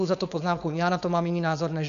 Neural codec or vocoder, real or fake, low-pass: codec, 16 kHz, 4.8 kbps, FACodec; fake; 7.2 kHz